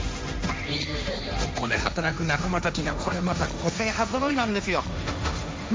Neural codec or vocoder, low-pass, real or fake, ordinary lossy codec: codec, 16 kHz, 1.1 kbps, Voila-Tokenizer; none; fake; none